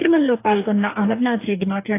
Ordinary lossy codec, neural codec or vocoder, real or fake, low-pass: AAC, 24 kbps; codec, 44.1 kHz, 2.6 kbps, DAC; fake; 3.6 kHz